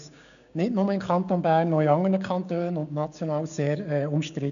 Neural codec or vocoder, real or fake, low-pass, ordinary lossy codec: codec, 16 kHz, 6 kbps, DAC; fake; 7.2 kHz; none